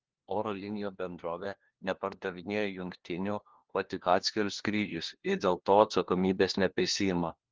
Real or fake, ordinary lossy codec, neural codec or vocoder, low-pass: fake; Opus, 16 kbps; codec, 16 kHz, 1 kbps, FunCodec, trained on LibriTTS, 50 frames a second; 7.2 kHz